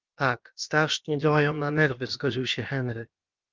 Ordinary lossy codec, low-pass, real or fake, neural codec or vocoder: Opus, 32 kbps; 7.2 kHz; fake; codec, 16 kHz, about 1 kbps, DyCAST, with the encoder's durations